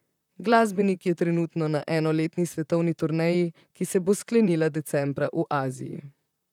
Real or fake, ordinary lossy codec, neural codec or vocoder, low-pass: fake; none; vocoder, 44.1 kHz, 128 mel bands, Pupu-Vocoder; 19.8 kHz